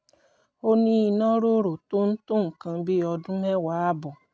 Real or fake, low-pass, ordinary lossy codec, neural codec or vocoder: real; none; none; none